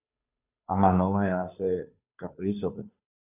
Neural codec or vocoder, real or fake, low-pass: codec, 16 kHz, 2 kbps, FunCodec, trained on Chinese and English, 25 frames a second; fake; 3.6 kHz